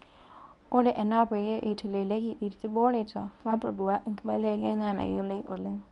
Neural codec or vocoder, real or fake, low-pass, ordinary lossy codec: codec, 24 kHz, 0.9 kbps, WavTokenizer, medium speech release version 1; fake; 10.8 kHz; none